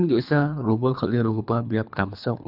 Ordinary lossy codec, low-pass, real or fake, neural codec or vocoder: none; 5.4 kHz; fake; codec, 24 kHz, 3 kbps, HILCodec